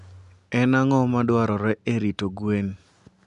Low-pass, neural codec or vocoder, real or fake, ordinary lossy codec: 10.8 kHz; none; real; none